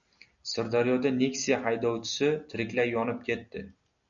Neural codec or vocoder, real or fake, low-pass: none; real; 7.2 kHz